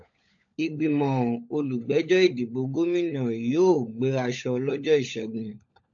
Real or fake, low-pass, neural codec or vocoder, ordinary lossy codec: fake; 7.2 kHz; codec, 16 kHz, 16 kbps, FunCodec, trained on LibriTTS, 50 frames a second; AAC, 64 kbps